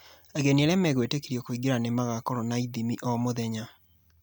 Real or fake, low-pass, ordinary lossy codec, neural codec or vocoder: real; none; none; none